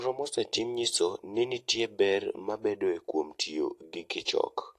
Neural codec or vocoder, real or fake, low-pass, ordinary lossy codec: none; real; 14.4 kHz; AAC, 48 kbps